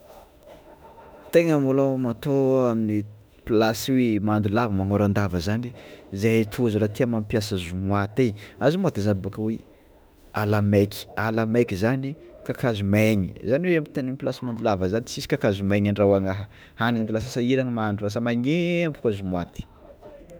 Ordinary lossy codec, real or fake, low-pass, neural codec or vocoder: none; fake; none; autoencoder, 48 kHz, 32 numbers a frame, DAC-VAE, trained on Japanese speech